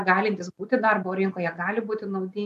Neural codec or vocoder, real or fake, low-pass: none; real; 14.4 kHz